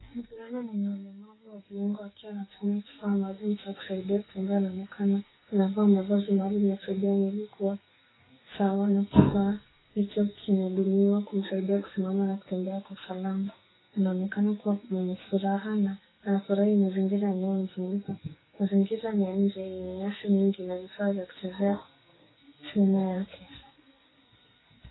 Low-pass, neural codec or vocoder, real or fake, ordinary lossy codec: 7.2 kHz; codec, 44.1 kHz, 2.6 kbps, SNAC; fake; AAC, 16 kbps